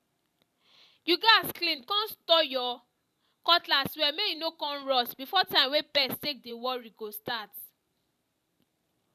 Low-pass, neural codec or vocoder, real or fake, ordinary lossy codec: 14.4 kHz; vocoder, 44.1 kHz, 128 mel bands every 512 samples, BigVGAN v2; fake; none